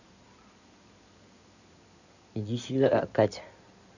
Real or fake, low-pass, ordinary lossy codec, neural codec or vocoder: fake; 7.2 kHz; none; codec, 16 kHz in and 24 kHz out, 2.2 kbps, FireRedTTS-2 codec